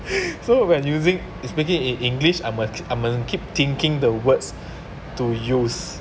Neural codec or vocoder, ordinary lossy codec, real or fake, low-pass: none; none; real; none